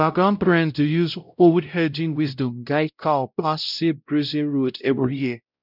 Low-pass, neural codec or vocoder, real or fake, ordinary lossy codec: 5.4 kHz; codec, 16 kHz, 0.5 kbps, X-Codec, WavLM features, trained on Multilingual LibriSpeech; fake; none